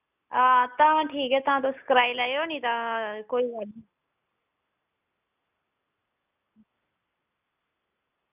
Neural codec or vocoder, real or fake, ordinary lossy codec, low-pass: none; real; none; 3.6 kHz